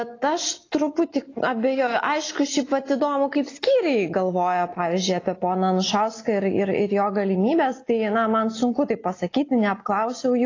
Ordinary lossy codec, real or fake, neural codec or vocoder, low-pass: AAC, 32 kbps; real; none; 7.2 kHz